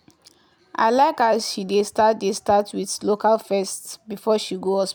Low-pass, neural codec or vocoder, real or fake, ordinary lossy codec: none; none; real; none